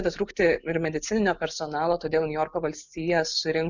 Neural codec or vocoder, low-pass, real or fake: none; 7.2 kHz; real